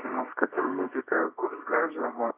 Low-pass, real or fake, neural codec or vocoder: 3.6 kHz; fake; codec, 24 kHz, 0.9 kbps, WavTokenizer, medium music audio release